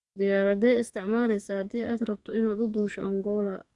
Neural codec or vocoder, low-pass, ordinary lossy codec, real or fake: codec, 44.1 kHz, 2.6 kbps, SNAC; 10.8 kHz; Opus, 64 kbps; fake